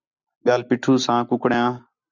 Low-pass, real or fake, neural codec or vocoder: 7.2 kHz; real; none